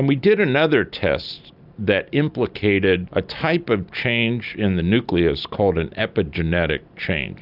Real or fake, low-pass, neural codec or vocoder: real; 5.4 kHz; none